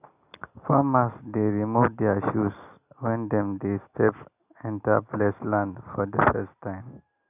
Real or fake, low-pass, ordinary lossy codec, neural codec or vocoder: real; 3.6 kHz; none; none